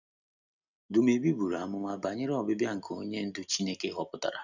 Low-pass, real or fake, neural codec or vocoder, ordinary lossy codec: 7.2 kHz; real; none; none